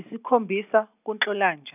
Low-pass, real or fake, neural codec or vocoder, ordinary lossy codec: 3.6 kHz; real; none; none